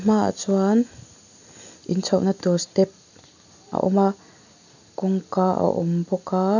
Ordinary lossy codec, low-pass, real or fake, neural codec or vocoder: none; 7.2 kHz; real; none